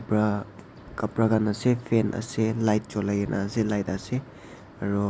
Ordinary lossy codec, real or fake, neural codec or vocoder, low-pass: none; real; none; none